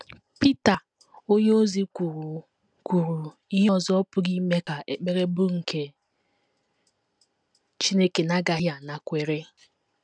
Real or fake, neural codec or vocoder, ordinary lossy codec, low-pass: real; none; none; 9.9 kHz